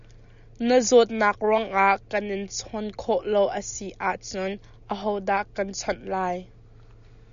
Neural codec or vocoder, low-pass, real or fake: none; 7.2 kHz; real